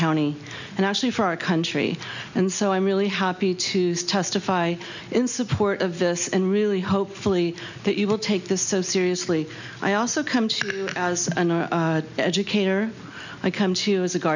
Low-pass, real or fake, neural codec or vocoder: 7.2 kHz; real; none